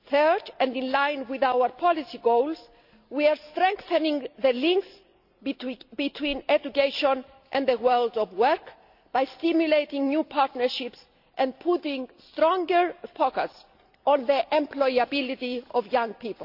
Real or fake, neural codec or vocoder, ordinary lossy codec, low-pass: real; none; none; 5.4 kHz